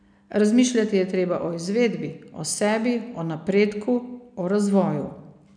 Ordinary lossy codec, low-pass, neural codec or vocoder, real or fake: none; 9.9 kHz; none; real